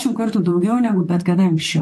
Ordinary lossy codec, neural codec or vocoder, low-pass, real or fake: AAC, 64 kbps; vocoder, 44.1 kHz, 128 mel bands, Pupu-Vocoder; 14.4 kHz; fake